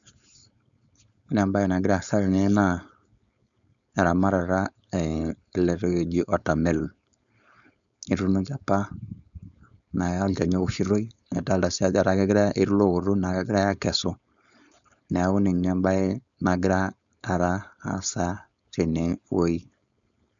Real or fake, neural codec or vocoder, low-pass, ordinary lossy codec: fake; codec, 16 kHz, 4.8 kbps, FACodec; 7.2 kHz; none